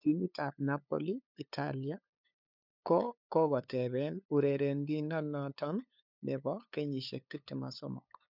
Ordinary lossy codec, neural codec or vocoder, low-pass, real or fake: none; codec, 16 kHz, 8 kbps, FunCodec, trained on LibriTTS, 25 frames a second; 5.4 kHz; fake